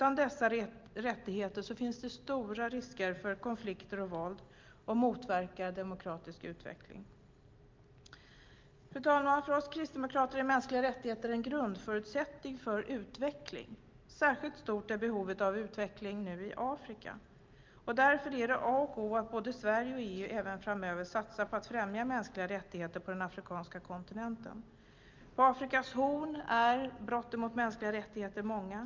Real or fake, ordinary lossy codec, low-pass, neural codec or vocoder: real; Opus, 24 kbps; 7.2 kHz; none